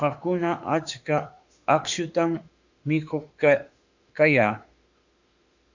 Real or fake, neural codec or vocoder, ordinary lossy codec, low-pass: fake; autoencoder, 48 kHz, 32 numbers a frame, DAC-VAE, trained on Japanese speech; Opus, 64 kbps; 7.2 kHz